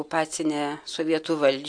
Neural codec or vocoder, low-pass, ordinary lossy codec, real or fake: none; 9.9 kHz; AAC, 64 kbps; real